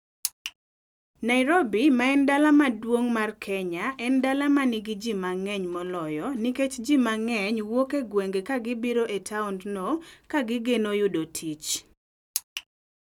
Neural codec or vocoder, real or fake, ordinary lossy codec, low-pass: none; real; none; 19.8 kHz